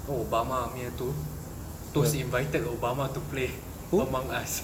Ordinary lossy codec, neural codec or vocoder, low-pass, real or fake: none; none; 19.8 kHz; real